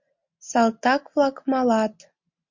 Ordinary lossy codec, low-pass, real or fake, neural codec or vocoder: MP3, 48 kbps; 7.2 kHz; real; none